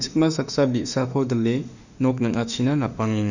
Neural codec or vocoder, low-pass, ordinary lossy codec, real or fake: autoencoder, 48 kHz, 32 numbers a frame, DAC-VAE, trained on Japanese speech; 7.2 kHz; none; fake